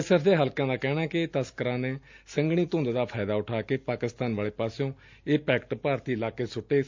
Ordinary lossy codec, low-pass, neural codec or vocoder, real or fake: MP3, 64 kbps; 7.2 kHz; none; real